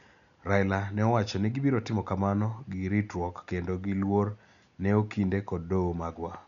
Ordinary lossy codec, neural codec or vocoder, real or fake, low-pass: none; none; real; 7.2 kHz